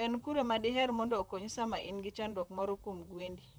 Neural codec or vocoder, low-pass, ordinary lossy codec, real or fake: vocoder, 44.1 kHz, 128 mel bands, Pupu-Vocoder; none; none; fake